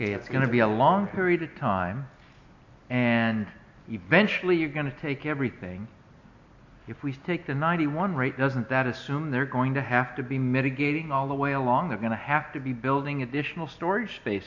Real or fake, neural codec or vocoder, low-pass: real; none; 7.2 kHz